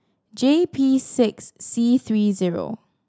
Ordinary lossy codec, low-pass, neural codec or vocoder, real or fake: none; none; none; real